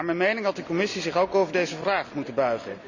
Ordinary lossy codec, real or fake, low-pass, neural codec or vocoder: none; real; 7.2 kHz; none